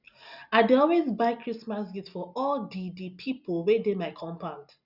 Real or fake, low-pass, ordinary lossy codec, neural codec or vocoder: real; 5.4 kHz; none; none